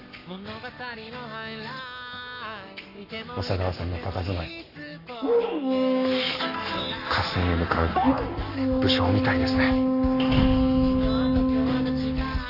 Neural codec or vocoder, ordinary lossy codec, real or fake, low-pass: codec, 44.1 kHz, 7.8 kbps, Pupu-Codec; none; fake; 5.4 kHz